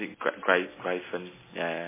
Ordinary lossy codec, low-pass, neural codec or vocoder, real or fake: MP3, 16 kbps; 3.6 kHz; none; real